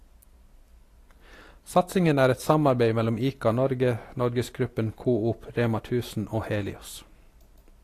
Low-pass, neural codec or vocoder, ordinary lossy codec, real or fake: 14.4 kHz; none; AAC, 48 kbps; real